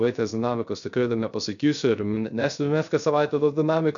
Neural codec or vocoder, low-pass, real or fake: codec, 16 kHz, 0.3 kbps, FocalCodec; 7.2 kHz; fake